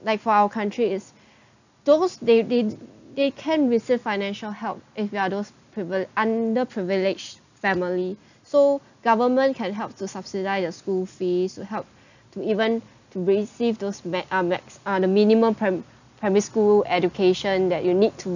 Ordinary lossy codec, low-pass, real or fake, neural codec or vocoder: none; 7.2 kHz; real; none